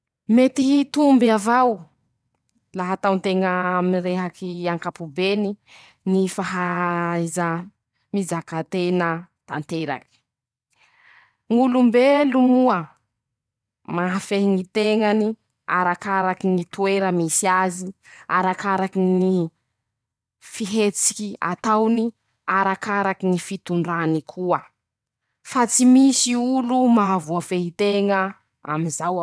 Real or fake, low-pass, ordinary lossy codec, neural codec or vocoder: fake; none; none; vocoder, 22.05 kHz, 80 mel bands, WaveNeXt